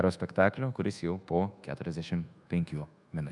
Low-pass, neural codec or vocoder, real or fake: 10.8 kHz; codec, 24 kHz, 1.2 kbps, DualCodec; fake